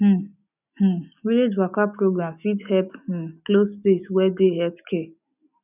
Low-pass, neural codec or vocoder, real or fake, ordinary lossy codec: 3.6 kHz; none; real; none